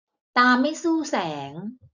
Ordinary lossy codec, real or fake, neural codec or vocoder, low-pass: none; real; none; 7.2 kHz